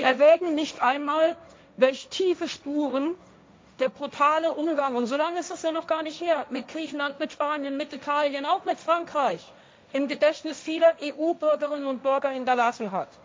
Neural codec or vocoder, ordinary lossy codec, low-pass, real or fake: codec, 16 kHz, 1.1 kbps, Voila-Tokenizer; none; none; fake